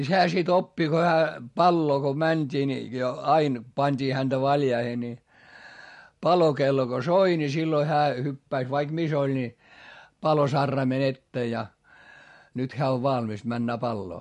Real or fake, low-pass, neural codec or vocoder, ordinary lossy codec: real; 14.4 kHz; none; MP3, 48 kbps